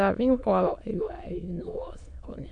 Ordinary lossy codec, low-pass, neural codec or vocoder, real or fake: none; 9.9 kHz; autoencoder, 22.05 kHz, a latent of 192 numbers a frame, VITS, trained on many speakers; fake